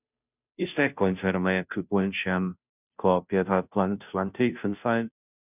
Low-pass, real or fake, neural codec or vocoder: 3.6 kHz; fake; codec, 16 kHz, 0.5 kbps, FunCodec, trained on Chinese and English, 25 frames a second